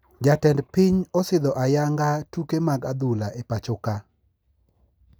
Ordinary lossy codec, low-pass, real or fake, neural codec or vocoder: none; none; real; none